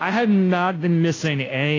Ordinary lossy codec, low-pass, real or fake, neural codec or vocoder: AAC, 32 kbps; 7.2 kHz; fake; codec, 16 kHz, 0.5 kbps, FunCodec, trained on Chinese and English, 25 frames a second